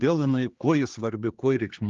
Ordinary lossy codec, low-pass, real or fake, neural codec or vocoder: Opus, 32 kbps; 7.2 kHz; fake; codec, 16 kHz, 2 kbps, X-Codec, HuBERT features, trained on general audio